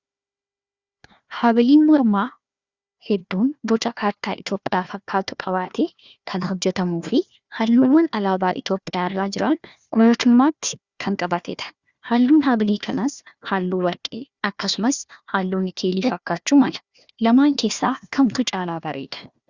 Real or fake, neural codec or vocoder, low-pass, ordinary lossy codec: fake; codec, 16 kHz, 1 kbps, FunCodec, trained on Chinese and English, 50 frames a second; 7.2 kHz; Opus, 64 kbps